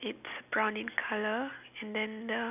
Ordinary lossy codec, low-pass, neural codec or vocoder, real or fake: none; 3.6 kHz; none; real